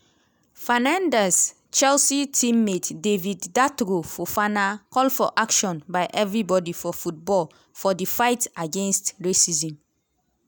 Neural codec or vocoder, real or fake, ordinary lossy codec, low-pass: none; real; none; none